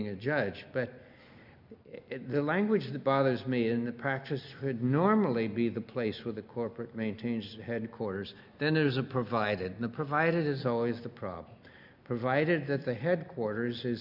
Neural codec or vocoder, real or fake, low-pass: none; real; 5.4 kHz